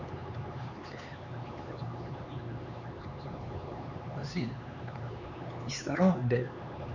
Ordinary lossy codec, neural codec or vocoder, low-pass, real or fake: none; codec, 16 kHz, 4 kbps, X-Codec, HuBERT features, trained on LibriSpeech; 7.2 kHz; fake